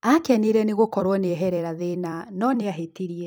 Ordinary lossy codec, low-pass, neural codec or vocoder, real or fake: none; none; vocoder, 44.1 kHz, 128 mel bands every 256 samples, BigVGAN v2; fake